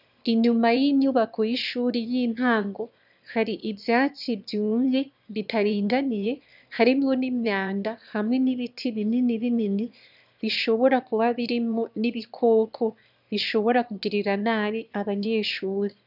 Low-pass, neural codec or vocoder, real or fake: 5.4 kHz; autoencoder, 22.05 kHz, a latent of 192 numbers a frame, VITS, trained on one speaker; fake